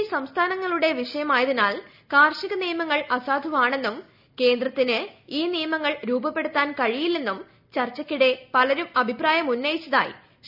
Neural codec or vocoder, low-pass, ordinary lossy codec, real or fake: none; 5.4 kHz; none; real